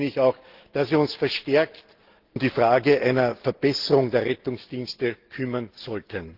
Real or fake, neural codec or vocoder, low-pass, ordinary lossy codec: real; none; 5.4 kHz; Opus, 16 kbps